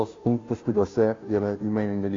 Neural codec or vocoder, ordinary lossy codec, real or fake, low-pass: codec, 16 kHz, 0.5 kbps, FunCodec, trained on Chinese and English, 25 frames a second; AAC, 32 kbps; fake; 7.2 kHz